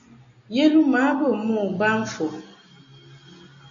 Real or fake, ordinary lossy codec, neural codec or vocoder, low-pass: real; AAC, 64 kbps; none; 7.2 kHz